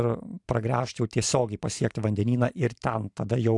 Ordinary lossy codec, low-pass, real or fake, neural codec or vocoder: AAC, 64 kbps; 10.8 kHz; fake; vocoder, 44.1 kHz, 128 mel bands every 512 samples, BigVGAN v2